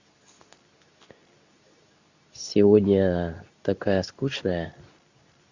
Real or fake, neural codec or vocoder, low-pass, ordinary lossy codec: fake; codec, 24 kHz, 0.9 kbps, WavTokenizer, medium speech release version 2; 7.2 kHz; Opus, 64 kbps